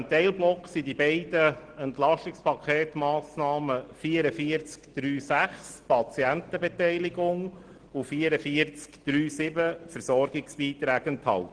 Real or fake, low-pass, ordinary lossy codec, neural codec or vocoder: real; 9.9 kHz; Opus, 16 kbps; none